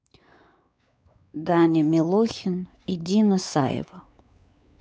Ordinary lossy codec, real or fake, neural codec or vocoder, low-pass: none; fake; codec, 16 kHz, 4 kbps, X-Codec, WavLM features, trained on Multilingual LibriSpeech; none